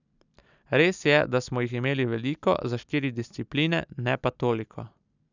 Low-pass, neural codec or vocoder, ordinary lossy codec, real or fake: 7.2 kHz; none; none; real